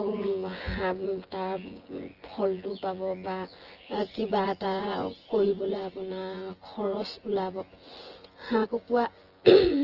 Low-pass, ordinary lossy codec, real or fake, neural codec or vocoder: 5.4 kHz; Opus, 16 kbps; fake; vocoder, 24 kHz, 100 mel bands, Vocos